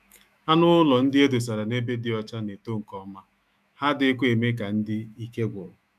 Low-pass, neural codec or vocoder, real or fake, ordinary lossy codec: 14.4 kHz; autoencoder, 48 kHz, 128 numbers a frame, DAC-VAE, trained on Japanese speech; fake; none